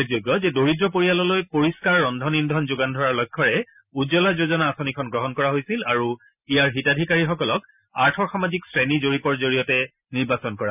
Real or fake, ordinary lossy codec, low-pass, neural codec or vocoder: real; none; 3.6 kHz; none